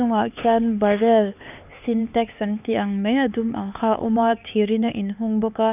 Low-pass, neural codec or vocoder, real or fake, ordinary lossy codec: 3.6 kHz; codec, 16 kHz, 4 kbps, X-Codec, HuBERT features, trained on LibriSpeech; fake; none